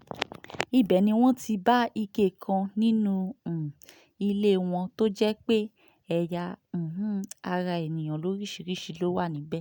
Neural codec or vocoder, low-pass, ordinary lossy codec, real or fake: none; 19.8 kHz; none; real